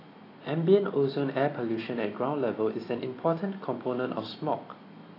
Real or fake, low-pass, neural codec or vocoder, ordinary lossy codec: real; 5.4 kHz; none; AAC, 24 kbps